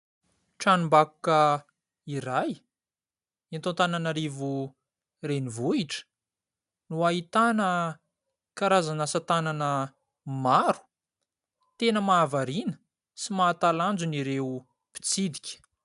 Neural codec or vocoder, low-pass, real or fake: none; 10.8 kHz; real